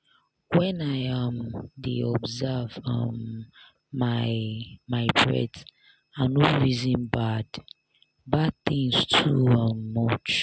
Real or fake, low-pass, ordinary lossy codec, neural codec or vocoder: real; none; none; none